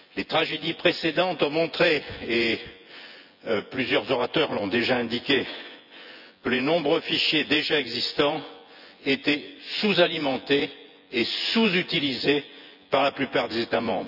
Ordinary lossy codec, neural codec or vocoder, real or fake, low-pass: none; vocoder, 24 kHz, 100 mel bands, Vocos; fake; 5.4 kHz